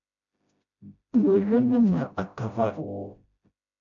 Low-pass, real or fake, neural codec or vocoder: 7.2 kHz; fake; codec, 16 kHz, 0.5 kbps, FreqCodec, smaller model